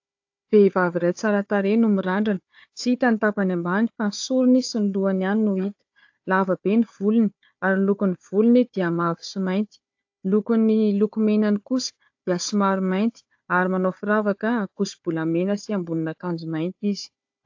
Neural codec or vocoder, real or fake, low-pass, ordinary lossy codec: codec, 16 kHz, 4 kbps, FunCodec, trained on Chinese and English, 50 frames a second; fake; 7.2 kHz; AAC, 48 kbps